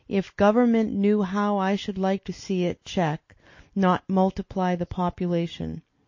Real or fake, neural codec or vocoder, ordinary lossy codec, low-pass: real; none; MP3, 32 kbps; 7.2 kHz